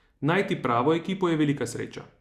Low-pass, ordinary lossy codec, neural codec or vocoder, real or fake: 14.4 kHz; Opus, 64 kbps; none; real